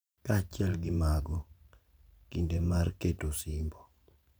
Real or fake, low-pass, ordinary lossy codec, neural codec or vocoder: fake; none; none; vocoder, 44.1 kHz, 128 mel bands every 512 samples, BigVGAN v2